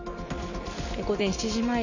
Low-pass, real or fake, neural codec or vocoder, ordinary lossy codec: 7.2 kHz; real; none; none